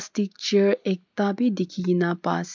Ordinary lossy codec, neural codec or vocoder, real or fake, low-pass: MP3, 64 kbps; none; real; 7.2 kHz